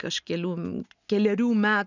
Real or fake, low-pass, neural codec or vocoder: real; 7.2 kHz; none